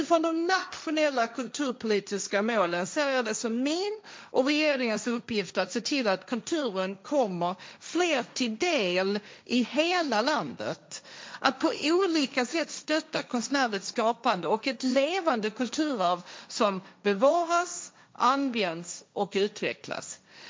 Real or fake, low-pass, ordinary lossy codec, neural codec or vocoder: fake; none; none; codec, 16 kHz, 1.1 kbps, Voila-Tokenizer